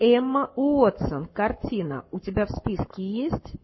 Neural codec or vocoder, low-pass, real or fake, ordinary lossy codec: codec, 44.1 kHz, 7.8 kbps, Pupu-Codec; 7.2 kHz; fake; MP3, 24 kbps